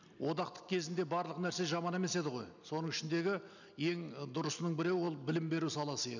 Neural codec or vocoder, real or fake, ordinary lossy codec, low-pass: none; real; none; 7.2 kHz